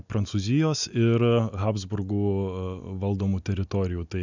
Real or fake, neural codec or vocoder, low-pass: real; none; 7.2 kHz